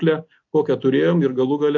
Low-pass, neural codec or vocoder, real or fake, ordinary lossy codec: 7.2 kHz; none; real; AAC, 48 kbps